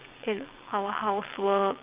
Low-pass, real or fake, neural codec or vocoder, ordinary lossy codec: 3.6 kHz; fake; vocoder, 22.05 kHz, 80 mel bands, WaveNeXt; Opus, 32 kbps